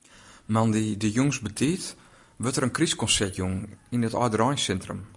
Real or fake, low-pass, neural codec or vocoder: real; 10.8 kHz; none